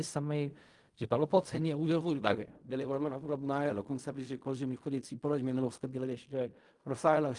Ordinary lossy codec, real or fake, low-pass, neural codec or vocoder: Opus, 32 kbps; fake; 10.8 kHz; codec, 16 kHz in and 24 kHz out, 0.4 kbps, LongCat-Audio-Codec, fine tuned four codebook decoder